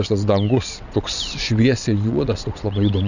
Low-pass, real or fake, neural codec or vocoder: 7.2 kHz; fake; vocoder, 22.05 kHz, 80 mel bands, WaveNeXt